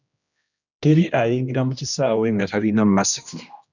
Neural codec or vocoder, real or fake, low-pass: codec, 16 kHz, 1 kbps, X-Codec, HuBERT features, trained on general audio; fake; 7.2 kHz